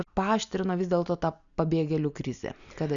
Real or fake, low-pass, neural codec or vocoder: real; 7.2 kHz; none